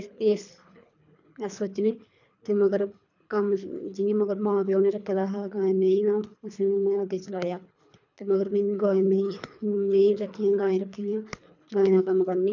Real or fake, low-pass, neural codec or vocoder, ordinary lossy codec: fake; 7.2 kHz; codec, 24 kHz, 3 kbps, HILCodec; none